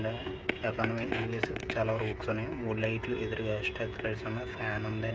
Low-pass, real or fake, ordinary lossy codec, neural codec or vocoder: none; fake; none; codec, 16 kHz, 16 kbps, FreqCodec, smaller model